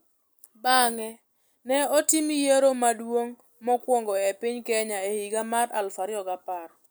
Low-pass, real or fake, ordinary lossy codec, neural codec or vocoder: none; real; none; none